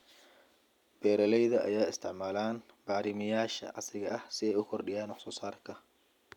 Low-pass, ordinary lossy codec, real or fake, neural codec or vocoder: 19.8 kHz; none; real; none